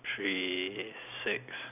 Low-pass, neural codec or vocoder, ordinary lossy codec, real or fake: 3.6 kHz; none; none; real